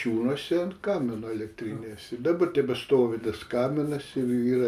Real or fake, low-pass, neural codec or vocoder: real; 14.4 kHz; none